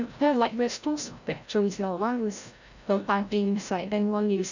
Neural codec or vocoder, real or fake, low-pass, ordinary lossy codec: codec, 16 kHz, 0.5 kbps, FreqCodec, larger model; fake; 7.2 kHz; none